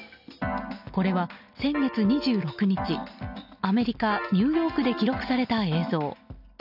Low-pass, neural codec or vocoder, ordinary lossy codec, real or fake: 5.4 kHz; none; none; real